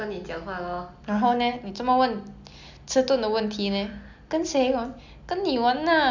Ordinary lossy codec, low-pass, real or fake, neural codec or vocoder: none; 7.2 kHz; real; none